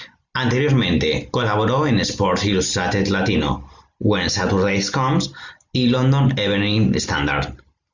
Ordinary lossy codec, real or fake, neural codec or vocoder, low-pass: Opus, 64 kbps; real; none; 7.2 kHz